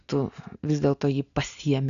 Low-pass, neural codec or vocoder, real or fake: 7.2 kHz; none; real